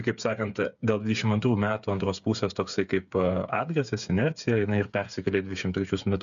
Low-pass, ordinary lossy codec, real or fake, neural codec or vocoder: 7.2 kHz; MP3, 96 kbps; fake; codec, 16 kHz, 8 kbps, FreqCodec, smaller model